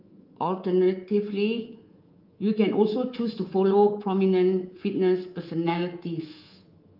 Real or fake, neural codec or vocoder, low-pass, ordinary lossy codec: fake; codec, 24 kHz, 3.1 kbps, DualCodec; 5.4 kHz; Opus, 24 kbps